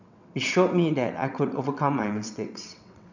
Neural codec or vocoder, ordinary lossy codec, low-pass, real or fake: vocoder, 22.05 kHz, 80 mel bands, WaveNeXt; none; 7.2 kHz; fake